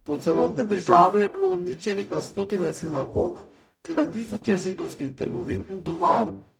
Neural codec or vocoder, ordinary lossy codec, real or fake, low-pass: codec, 44.1 kHz, 0.9 kbps, DAC; none; fake; 19.8 kHz